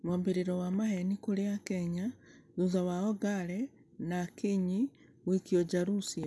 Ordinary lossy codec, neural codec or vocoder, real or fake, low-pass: none; none; real; none